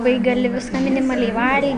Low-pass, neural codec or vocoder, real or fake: 9.9 kHz; none; real